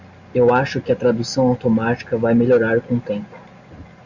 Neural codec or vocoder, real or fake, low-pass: none; real; 7.2 kHz